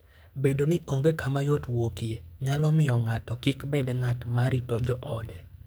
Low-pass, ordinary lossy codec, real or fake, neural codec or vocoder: none; none; fake; codec, 44.1 kHz, 2.6 kbps, SNAC